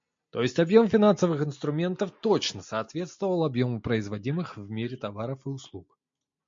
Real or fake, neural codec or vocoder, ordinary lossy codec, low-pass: real; none; MP3, 48 kbps; 7.2 kHz